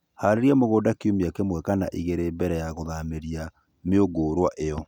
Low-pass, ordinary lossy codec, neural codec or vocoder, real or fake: 19.8 kHz; none; none; real